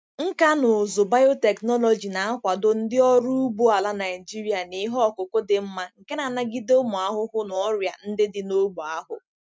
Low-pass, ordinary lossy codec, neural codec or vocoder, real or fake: none; none; none; real